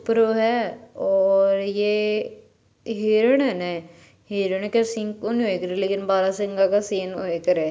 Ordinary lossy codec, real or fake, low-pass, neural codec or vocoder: none; real; none; none